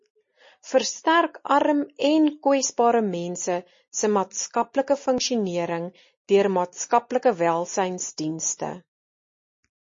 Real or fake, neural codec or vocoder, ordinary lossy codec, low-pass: real; none; MP3, 32 kbps; 7.2 kHz